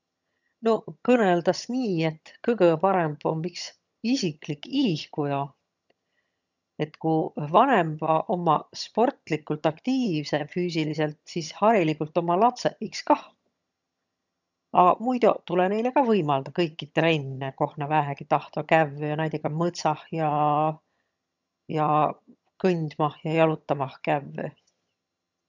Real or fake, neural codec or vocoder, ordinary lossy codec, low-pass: fake; vocoder, 22.05 kHz, 80 mel bands, HiFi-GAN; none; 7.2 kHz